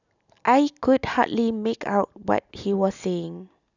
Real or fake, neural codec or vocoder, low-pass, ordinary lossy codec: real; none; 7.2 kHz; none